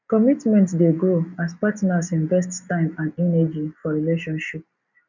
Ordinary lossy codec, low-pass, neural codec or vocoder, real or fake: none; 7.2 kHz; none; real